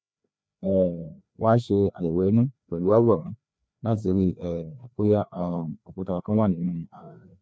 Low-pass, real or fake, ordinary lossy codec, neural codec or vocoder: none; fake; none; codec, 16 kHz, 2 kbps, FreqCodec, larger model